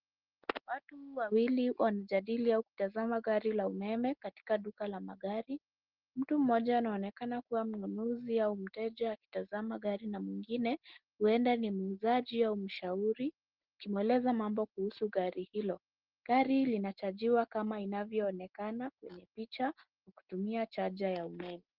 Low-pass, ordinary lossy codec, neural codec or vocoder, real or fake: 5.4 kHz; Opus, 16 kbps; none; real